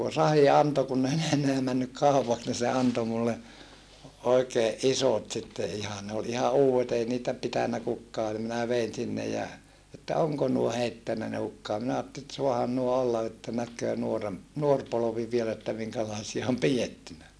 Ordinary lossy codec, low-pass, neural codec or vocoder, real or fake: none; none; none; real